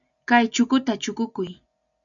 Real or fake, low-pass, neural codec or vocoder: real; 7.2 kHz; none